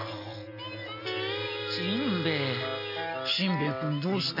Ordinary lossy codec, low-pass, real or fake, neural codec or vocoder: none; 5.4 kHz; real; none